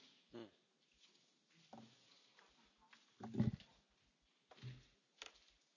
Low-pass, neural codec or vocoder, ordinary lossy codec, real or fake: 7.2 kHz; none; none; real